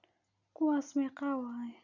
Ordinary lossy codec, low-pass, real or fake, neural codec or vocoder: none; 7.2 kHz; real; none